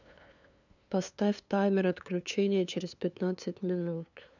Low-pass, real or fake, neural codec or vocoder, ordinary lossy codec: 7.2 kHz; fake; codec, 16 kHz, 2 kbps, FunCodec, trained on LibriTTS, 25 frames a second; none